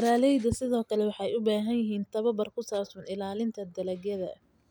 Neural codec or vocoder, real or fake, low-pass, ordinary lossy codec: none; real; none; none